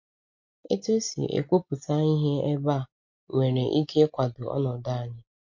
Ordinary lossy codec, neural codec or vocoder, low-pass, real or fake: MP3, 48 kbps; none; 7.2 kHz; real